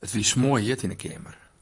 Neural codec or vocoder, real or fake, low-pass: vocoder, 44.1 kHz, 128 mel bands, Pupu-Vocoder; fake; 10.8 kHz